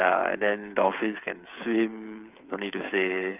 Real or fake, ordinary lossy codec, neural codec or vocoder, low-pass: fake; none; codec, 16 kHz, 8 kbps, FreqCodec, smaller model; 3.6 kHz